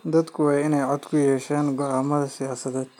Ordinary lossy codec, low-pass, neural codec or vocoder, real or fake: none; 19.8 kHz; none; real